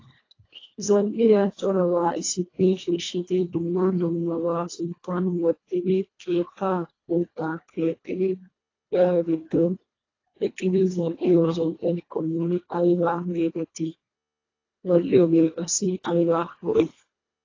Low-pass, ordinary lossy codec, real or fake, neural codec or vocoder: 7.2 kHz; AAC, 32 kbps; fake; codec, 24 kHz, 1.5 kbps, HILCodec